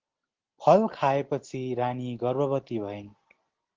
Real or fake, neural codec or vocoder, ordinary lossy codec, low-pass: real; none; Opus, 16 kbps; 7.2 kHz